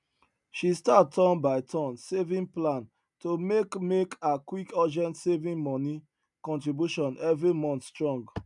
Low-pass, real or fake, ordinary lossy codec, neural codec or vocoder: 10.8 kHz; real; none; none